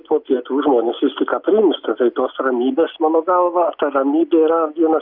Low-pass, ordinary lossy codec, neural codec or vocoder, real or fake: 5.4 kHz; Opus, 64 kbps; none; real